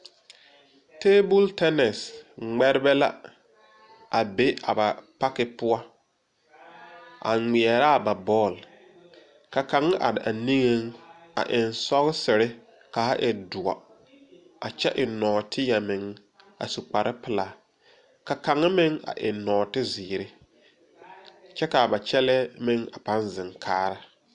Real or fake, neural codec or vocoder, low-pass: real; none; 10.8 kHz